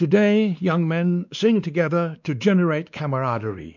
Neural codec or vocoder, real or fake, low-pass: codec, 16 kHz, 2 kbps, X-Codec, WavLM features, trained on Multilingual LibriSpeech; fake; 7.2 kHz